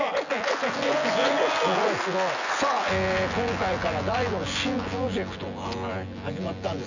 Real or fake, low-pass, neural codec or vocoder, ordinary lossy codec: fake; 7.2 kHz; vocoder, 24 kHz, 100 mel bands, Vocos; none